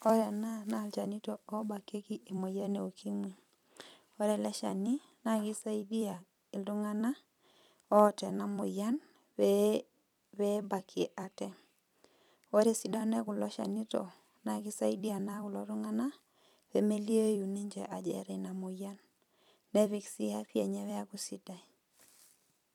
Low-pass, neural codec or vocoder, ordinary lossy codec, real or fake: 19.8 kHz; vocoder, 44.1 kHz, 128 mel bands every 256 samples, BigVGAN v2; none; fake